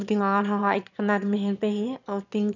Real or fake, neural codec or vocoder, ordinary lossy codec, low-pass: fake; autoencoder, 22.05 kHz, a latent of 192 numbers a frame, VITS, trained on one speaker; none; 7.2 kHz